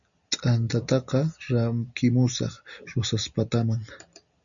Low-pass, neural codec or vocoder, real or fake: 7.2 kHz; none; real